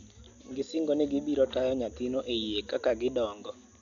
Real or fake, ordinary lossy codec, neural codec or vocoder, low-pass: real; none; none; 7.2 kHz